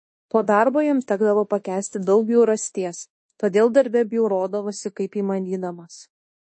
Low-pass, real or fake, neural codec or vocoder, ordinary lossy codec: 9.9 kHz; fake; codec, 24 kHz, 1.2 kbps, DualCodec; MP3, 32 kbps